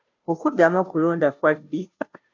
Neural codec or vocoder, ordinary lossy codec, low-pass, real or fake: codec, 16 kHz, 0.5 kbps, FunCodec, trained on Chinese and English, 25 frames a second; MP3, 64 kbps; 7.2 kHz; fake